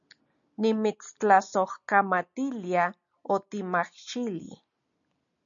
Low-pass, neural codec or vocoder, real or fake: 7.2 kHz; none; real